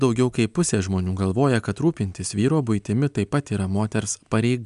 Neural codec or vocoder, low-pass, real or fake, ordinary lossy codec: none; 10.8 kHz; real; MP3, 96 kbps